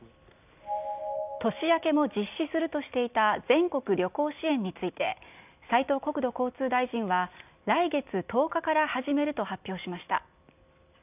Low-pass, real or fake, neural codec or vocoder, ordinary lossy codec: 3.6 kHz; real; none; none